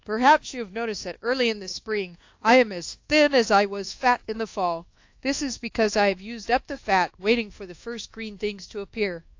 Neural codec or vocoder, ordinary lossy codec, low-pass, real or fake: codec, 24 kHz, 1.2 kbps, DualCodec; AAC, 48 kbps; 7.2 kHz; fake